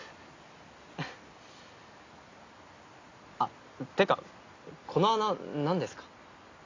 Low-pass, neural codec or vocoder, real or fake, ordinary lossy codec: 7.2 kHz; none; real; AAC, 32 kbps